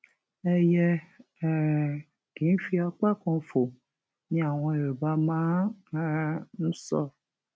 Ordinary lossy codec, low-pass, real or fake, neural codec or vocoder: none; none; real; none